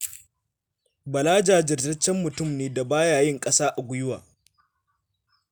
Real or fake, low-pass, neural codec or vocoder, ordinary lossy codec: real; none; none; none